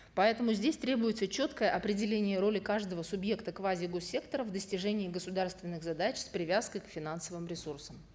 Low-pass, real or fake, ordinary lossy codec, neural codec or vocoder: none; real; none; none